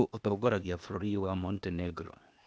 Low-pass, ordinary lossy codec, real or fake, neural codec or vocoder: none; none; fake; codec, 16 kHz, 0.8 kbps, ZipCodec